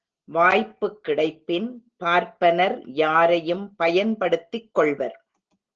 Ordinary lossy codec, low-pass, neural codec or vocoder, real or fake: Opus, 16 kbps; 7.2 kHz; none; real